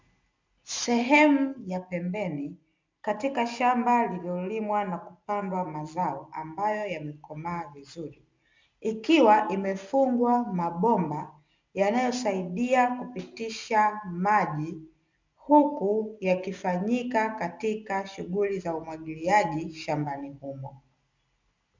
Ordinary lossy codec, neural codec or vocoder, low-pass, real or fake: MP3, 64 kbps; none; 7.2 kHz; real